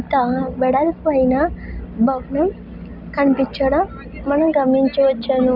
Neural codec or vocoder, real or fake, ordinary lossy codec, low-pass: none; real; none; 5.4 kHz